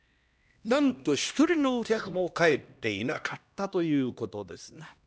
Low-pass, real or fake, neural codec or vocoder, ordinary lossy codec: none; fake; codec, 16 kHz, 1 kbps, X-Codec, HuBERT features, trained on LibriSpeech; none